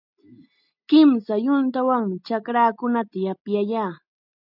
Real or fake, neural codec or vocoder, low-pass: real; none; 5.4 kHz